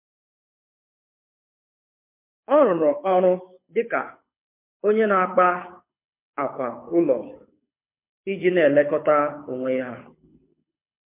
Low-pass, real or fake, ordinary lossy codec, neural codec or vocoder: 3.6 kHz; fake; MP3, 24 kbps; codec, 24 kHz, 6 kbps, HILCodec